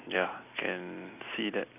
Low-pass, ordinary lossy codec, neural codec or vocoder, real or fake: 3.6 kHz; none; none; real